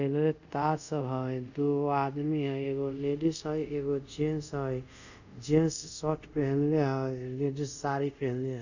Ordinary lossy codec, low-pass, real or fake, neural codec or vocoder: none; 7.2 kHz; fake; codec, 24 kHz, 0.5 kbps, DualCodec